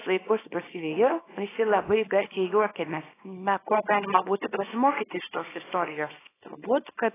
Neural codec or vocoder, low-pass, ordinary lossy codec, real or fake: codec, 24 kHz, 0.9 kbps, WavTokenizer, small release; 3.6 kHz; AAC, 16 kbps; fake